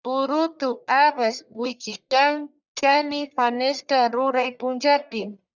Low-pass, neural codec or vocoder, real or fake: 7.2 kHz; codec, 44.1 kHz, 1.7 kbps, Pupu-Codec; fake